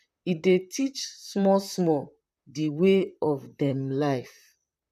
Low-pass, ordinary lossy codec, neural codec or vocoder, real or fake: 14.4 kHz; none; vocoder, 44.1 kHz, 128 mel bands, Pupu-Vocoder; fake